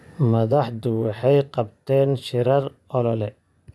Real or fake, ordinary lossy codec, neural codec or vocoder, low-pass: fake; none; vocoder, 24 kHz, 100 mel bands, Vocos; none